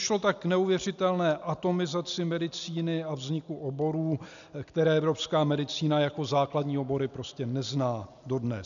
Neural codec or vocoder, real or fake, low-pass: none; real; 7.2 kHz